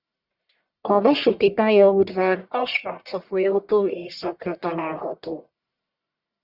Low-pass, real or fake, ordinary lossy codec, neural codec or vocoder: 5.4 kHz; fake; Opus, 64 kbps; codec, 44.1 kHz, 1.7 kbps, Pupu-Codec